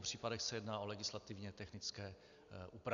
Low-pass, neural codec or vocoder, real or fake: 7.2 kHz; none; real